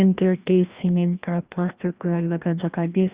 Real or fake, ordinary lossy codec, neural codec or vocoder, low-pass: fake; Opus, 64 kbps; codec, 16 kHz, 1 kbps, FreqCodec, larger model; 3.6 kHz